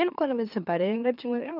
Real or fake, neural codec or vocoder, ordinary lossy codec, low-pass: fake; autoencoder, 44.1 kHz, a latent of 192 numbers a frame, MeloTTS; none; 5.4 kHz